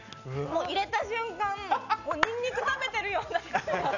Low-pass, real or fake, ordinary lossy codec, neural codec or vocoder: 7.2 kHz; real; none; none